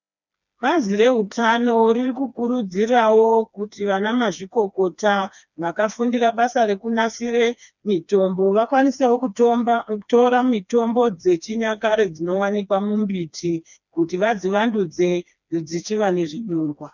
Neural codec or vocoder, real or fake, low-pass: codec, 16 kHz, 2 kbps, FreqCodec, smaller model; fake; 7.2 kHz